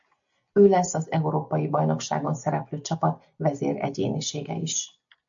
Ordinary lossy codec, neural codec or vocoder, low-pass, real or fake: MP3, 64 kbps; none; 7.2 kHz; real